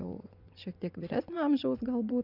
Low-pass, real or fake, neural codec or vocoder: 5.4 kHz; fake; vocoder, 24 kHz, 100 mel bands, Vocos